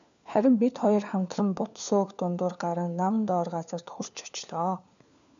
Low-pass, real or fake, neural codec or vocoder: 7.2 kHz; fake; codec, 16 kHz, 4 kbps, FunCodec, trained on LibriTTS, 50 frames a second